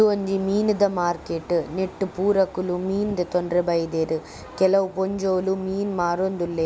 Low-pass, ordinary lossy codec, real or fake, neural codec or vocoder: none; none; real; none